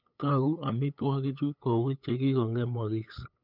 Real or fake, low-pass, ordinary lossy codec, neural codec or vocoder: fake; 5.4 kHz; none; codec, 16 kHz, 8 kbps, FunCodec, trained on LibriTTS, 25 frames a second